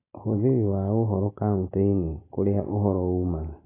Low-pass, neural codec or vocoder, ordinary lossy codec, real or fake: 3.6 kHz; none; AAC, 16 kbps; real